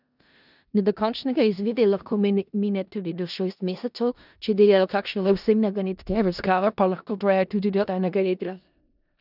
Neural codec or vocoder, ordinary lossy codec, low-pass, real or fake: codec, 16 kHz in and 24 kHz out, 0.4 kbps, LongCat-Audio-Codec, four codebook decoder; none; 5.4 kHz; fake